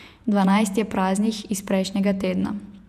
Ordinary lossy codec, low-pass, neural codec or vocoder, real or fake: none; 14.4 kHz; vocoder, 48 kHz, 128 mel bands, Vocos; fake